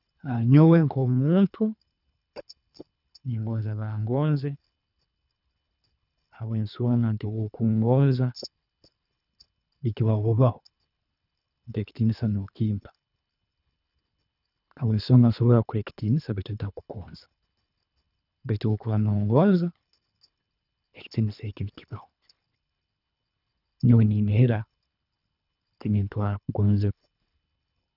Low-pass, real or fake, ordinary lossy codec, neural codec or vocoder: 5.4 kHz; fake; none; codec, 24 kHz, 3 kbps, HILCodec